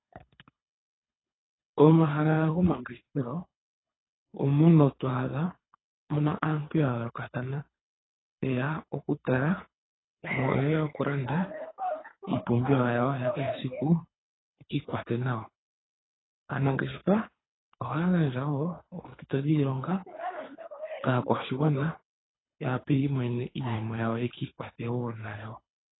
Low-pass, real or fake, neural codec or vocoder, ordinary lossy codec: 7.2 kHz; fake; codec, 24 kHz, 3 kbps, HILCodec; AAC, 16 kbps